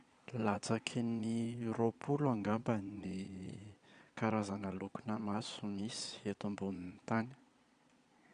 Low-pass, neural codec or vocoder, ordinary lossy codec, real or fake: 9.9 kHz; vocoder, 22.05 kHz, 80 mel bands, Vocos; Opus, 64 kbps; fake